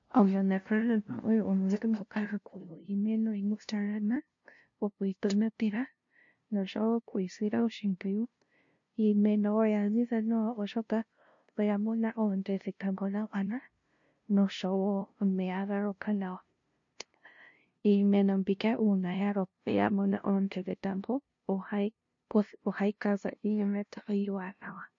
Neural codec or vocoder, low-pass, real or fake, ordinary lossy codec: codec, 16 kHz, 0.5 kbps, FunCodec, trained on LibriTTS, 25 frames a second; 7.2 kHz; fake; MP3, 48 kbps